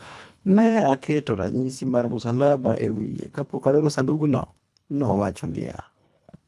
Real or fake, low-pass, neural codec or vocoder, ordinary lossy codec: fake; none; codec, 24 kHz, 1.5 kbps, HILCodec; none